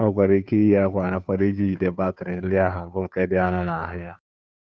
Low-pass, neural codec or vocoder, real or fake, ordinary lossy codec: none; codec, 16 kHz, 2 kbps, FunCodec, trained on Chinese and English, 25 frames a second; fake; none